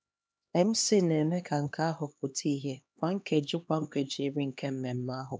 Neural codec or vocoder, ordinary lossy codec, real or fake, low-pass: codec, 16 kHz, 2 kbps, X-Codec, HuBERT features, trained on LibriSpeech; none; fake; none